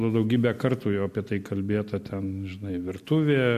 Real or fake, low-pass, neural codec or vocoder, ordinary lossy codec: real; 14.4 kHz; none; MP3, 64 kbps